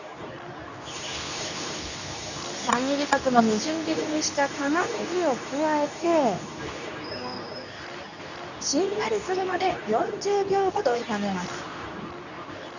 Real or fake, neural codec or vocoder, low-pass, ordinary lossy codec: fake; codec, 24 kHz, 0.9 kbps, WavTokenizer, medium speech release version 2; 7.2 kHz; none